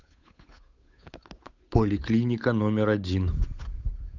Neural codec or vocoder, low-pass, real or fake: codec, 16 kHz, 8 kbps, FunCodec, trained on Chinese and English, 25 frames a second; 7.2 kHz; fake